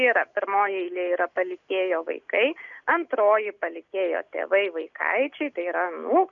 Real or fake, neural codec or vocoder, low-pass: real; none; 7.2 kHz